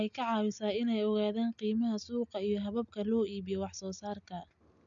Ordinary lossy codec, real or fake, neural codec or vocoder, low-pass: MP3, 96 kbps; real; none; 7.2 kHz